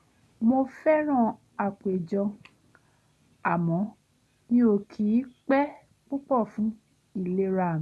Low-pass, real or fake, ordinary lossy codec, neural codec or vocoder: none; real; none; none